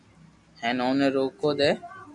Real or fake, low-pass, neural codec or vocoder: real; 10.8 kHz; none